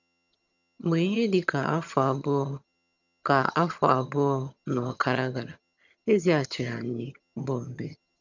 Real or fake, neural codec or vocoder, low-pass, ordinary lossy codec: fake; vocoder, 22.05 kHz, 80 mel bands, HiFi-GAN; 7.2 kHz; none